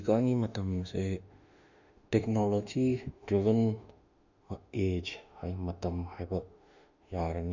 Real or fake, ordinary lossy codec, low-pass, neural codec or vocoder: fake; none; 7.2 kHz; autoencoder, 48 kHz, 32 numbers a frame, DAC-VAE, trained on Japanese speech